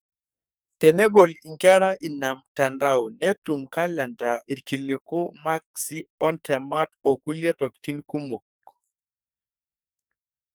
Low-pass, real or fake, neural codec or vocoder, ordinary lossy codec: none; fake; codec, 44.1 kHz, 2.6 kbps, SNAC; none